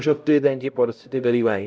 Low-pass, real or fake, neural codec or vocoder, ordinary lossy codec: none; fake; codec, 16 kHz, 0.5 kbps, X-Codec, HuBERT features, trained on LibriSpeech; none